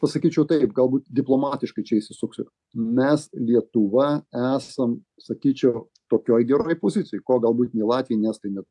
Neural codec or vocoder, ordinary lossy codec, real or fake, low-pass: none; AAC, 64 kbps; real; 10.8 kHz